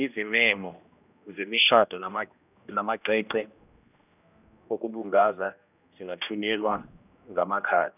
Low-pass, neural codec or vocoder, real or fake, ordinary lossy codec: 3.6 kHz; codec, 16 kHz, 1 kbps, X-Codec, HuBERT features, trained on general audio; fake; none